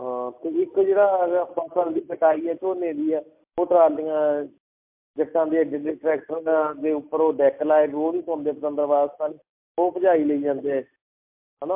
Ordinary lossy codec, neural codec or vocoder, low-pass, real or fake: MP3, 32 kbps; none; 3.6 kHz; real